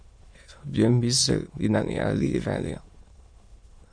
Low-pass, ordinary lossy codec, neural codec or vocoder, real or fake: 9.9 kHz; MP3, 48 kbps; autoencoder, 22.05 kHz, a latent of 192 numbers a frame, VITS, trained on many speakers; fake